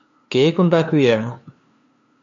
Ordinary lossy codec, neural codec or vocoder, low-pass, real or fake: AAC, 64 kbps; codec, 16 kHz, 2 kbps, FunCodec, trained on LibriTTS, 25 frames a second; 7.2 kHz; fake